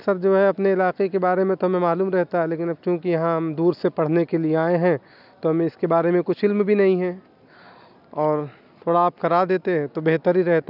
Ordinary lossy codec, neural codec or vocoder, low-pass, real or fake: none; none; 5.4 kHz; real